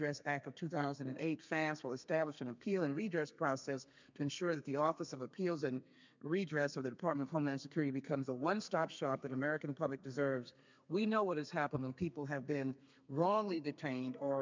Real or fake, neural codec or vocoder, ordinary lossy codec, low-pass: fake; codec, 32 kHz, 1.9 kbps, SNAC; MP3, 64 kbps; 7.2 kHz